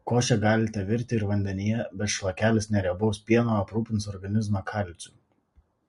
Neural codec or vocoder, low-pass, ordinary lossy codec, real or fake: none; 14.4 kHz; MP3, 48 kbps; real